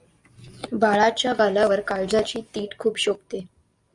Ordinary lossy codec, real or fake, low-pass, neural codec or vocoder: Opus, 64 kbps; real; 10.8 kHz; none